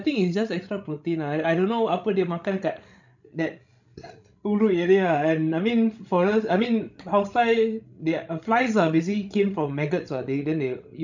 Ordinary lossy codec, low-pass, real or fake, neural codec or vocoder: none; 7.2 kHz; fake; codec, 16 kHz, 16 kbps, FreqCodec, larger model